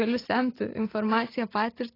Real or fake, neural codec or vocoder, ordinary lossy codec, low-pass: real; none; AAC, 24 kbps; 5.4 kHz